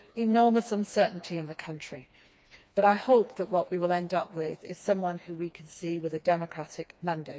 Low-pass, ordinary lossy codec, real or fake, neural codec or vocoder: none; none; fake; codec, 16 kHz, 2 kbps, FreqCodec, smaller model